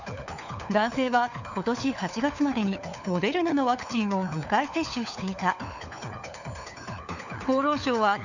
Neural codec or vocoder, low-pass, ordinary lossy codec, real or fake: codec, 16 kHz, 4 kbps, FunCodec, trained on LibriTTS, 50 frames a second; 7.2 kHz; none; fake